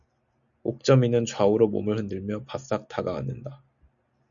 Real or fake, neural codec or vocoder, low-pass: real; none; 7.2 kHz